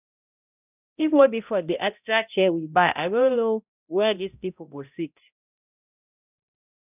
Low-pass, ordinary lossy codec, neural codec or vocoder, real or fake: 3.6 kHz; none; codec, 16 kHz, 0.5 kbps, X-Codec, HuBERT features, trained on balanced general audio; fake